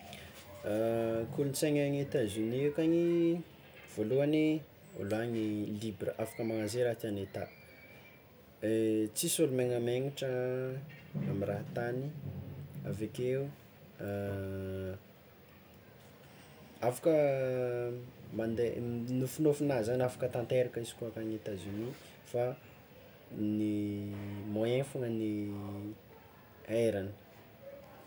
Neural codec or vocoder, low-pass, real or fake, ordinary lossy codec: none; none; real; none